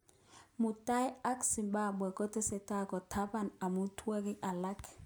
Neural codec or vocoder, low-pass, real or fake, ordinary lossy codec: none; none; real; none